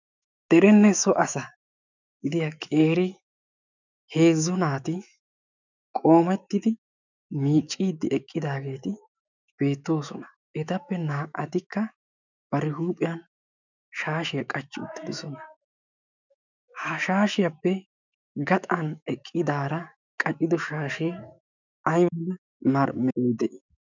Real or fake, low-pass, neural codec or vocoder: fake; 7.2 kHz; autoencoder, 48 kHz, 128 numbers a frame, DAC-VAE, trained on Japanese speech